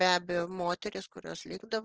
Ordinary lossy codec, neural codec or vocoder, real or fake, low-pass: Opus, 16 kbps; none; real; 7.2 kHz